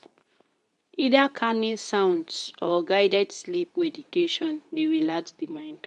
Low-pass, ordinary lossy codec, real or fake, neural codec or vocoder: 10.8 kHz; none; fake; codec, 24 kHz, 0.9 kbps, WavTokenizer, medium speech release version 2